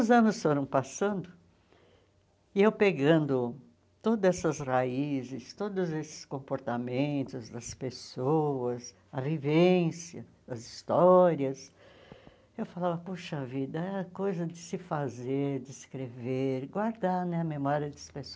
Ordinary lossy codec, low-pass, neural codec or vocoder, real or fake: none; none; none; real